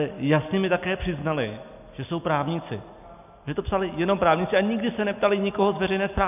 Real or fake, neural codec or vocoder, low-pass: real; none; 3.6 kHz